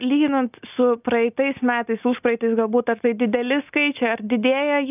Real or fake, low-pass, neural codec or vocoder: real; 3.6 kHz; none